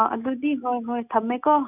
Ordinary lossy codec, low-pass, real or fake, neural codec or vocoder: none; 3.6 kHz; real; none